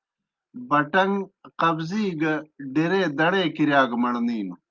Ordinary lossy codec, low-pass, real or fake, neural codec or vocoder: Opus, 24 kbps; 7.2 kHz; real; none